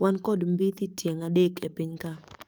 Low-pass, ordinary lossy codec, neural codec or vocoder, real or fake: none; none; codec, 44.1 kHz, 7.8 kbps, DAC; fake